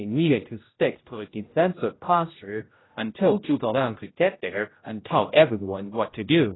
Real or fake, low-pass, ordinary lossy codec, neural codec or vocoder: fake; 7.2 kHz; AAC, 16 kbps; codec, 16 kHz, 0.5 kbps, X-Codec, HuBERT features, trained on general audio